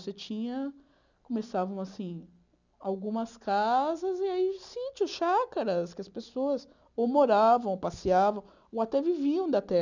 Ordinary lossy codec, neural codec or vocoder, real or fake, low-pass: none; none; real; 7.2 kHz